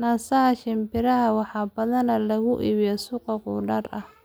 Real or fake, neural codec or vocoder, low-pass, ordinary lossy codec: real; none; none; none